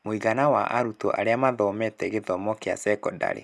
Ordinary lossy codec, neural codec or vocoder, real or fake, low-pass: none; none; real; none